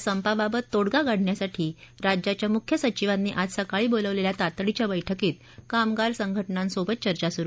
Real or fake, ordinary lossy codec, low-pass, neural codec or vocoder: real; none; none; none